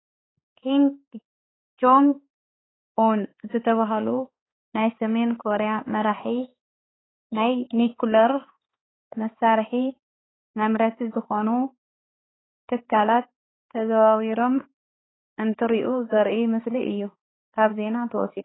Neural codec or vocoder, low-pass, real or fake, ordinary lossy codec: codec, 16 kHz, 4 kbps, X-Codec, HuBERT features, trained on balanced general audio; 7.2 kHz; fake; AAC, 16 kbps